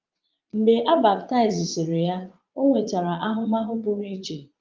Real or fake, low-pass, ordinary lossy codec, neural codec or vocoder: fake; 7.2 kHz; Opus, 24 kbps; vocoder, 22.05 kHz, 80 mel bands, Vocos